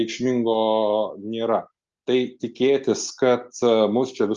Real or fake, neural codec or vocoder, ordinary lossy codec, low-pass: real; none; Opus, 32 kbps; 7.2 kHz